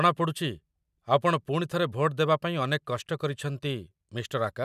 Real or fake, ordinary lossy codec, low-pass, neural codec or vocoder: real; none; 14.4 kHz; none